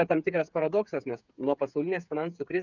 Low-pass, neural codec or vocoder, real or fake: 7.2 kHz; codec, 16 kHz, 8 kbps, FreqCodec, smaller model; fake